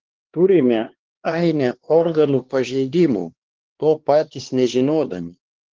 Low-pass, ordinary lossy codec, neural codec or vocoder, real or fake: 7.2 kHz; Opus, 16 kbps; codec, 16 kHz, 2 kbps, X-Codec, HuBERT features, trained on LibriSpeech; fake